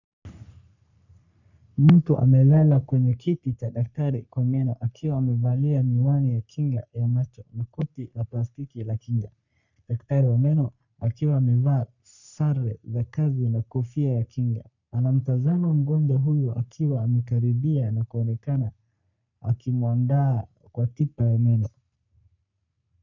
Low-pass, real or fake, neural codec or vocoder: 7.2 kHz; fake; codec, 44.1 kHz, 3.4 kbps, Pupu-Codec